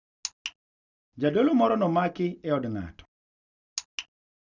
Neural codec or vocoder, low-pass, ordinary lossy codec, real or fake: none; 7.2 kHz; none; real